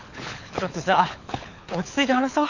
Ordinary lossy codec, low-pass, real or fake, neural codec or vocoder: none; 7.2 kHz; fake; codec, 24 kHz, 6 kbps, HILCodec